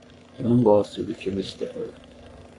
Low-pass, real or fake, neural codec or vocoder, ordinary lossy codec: 10.8 kHz; fake; codec, 44.1 kHz, 3.4 kbps, Pupu-Codec; AAC, 48 kbps